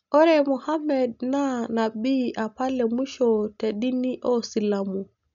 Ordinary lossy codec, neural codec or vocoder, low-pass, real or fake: none; none; 7.2 kHz; real